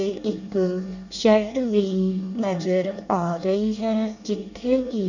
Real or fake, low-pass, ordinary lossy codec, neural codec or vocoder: fake; 7.2 kHz; none; codec, 24 kHz, 1 kbps, SNAC